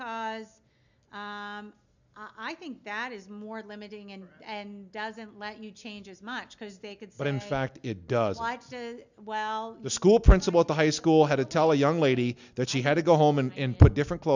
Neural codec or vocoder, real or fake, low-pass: none; real; 7.2 kHz